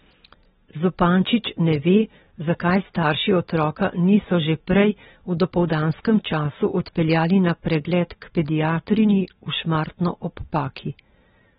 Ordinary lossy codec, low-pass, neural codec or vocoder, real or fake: AAC, 16 kbps; 19.8 kHz; none; real